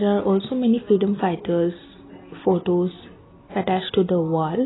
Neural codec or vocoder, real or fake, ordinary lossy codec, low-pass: none; real; AAC, 16 kbps; 7.2 kHz